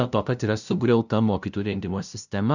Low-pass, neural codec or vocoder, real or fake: 7.2 kHz; codec, 16 kHz, 0.5 kbps, FunCodec, trained on LibriTTS, 25 frames a second; fake